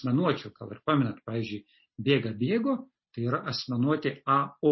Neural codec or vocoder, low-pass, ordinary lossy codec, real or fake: none; 7.2 kHz; MP3, 24 kbps; real